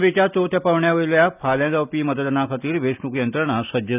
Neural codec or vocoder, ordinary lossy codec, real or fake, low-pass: none; none; real; 3.6 kHz